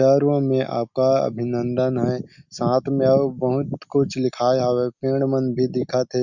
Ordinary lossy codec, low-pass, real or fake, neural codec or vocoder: Opus, 64 kbps; 7.2 kHz; real; none